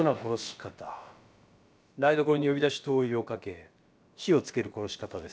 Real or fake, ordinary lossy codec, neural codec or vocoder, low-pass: fake; none; codec, 16 kHz, about 1 kbps, DyCAST, with the encoder's durations; none